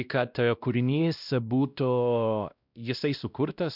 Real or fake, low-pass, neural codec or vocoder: fake; 5.4 kHz; codec, 16 kHz, 1 kbps, X-Codec, WavLM features, trained on Multilingual LibriSpeech